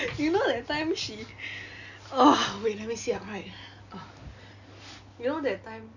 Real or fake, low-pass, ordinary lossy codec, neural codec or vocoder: real; 7.2 kHz; none; none